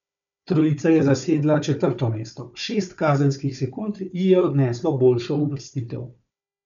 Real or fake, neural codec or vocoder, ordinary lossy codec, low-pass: fake; codec, 16 kHz, 4 kbps, FunCodec, trained on Chinese and English, 50 frames a second; none; 7.2 kHz